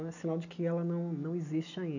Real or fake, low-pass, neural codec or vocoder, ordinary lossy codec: real; 7.2 kHz; none; none